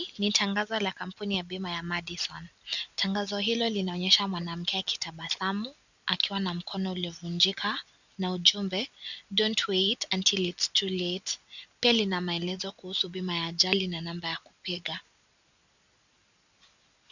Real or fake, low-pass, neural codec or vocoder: real; 7.2 kHz; none